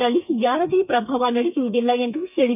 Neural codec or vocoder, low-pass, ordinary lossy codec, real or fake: codec, 32 kHz, 1.9 kbps, SNAC; 3.6 kHz; none; fake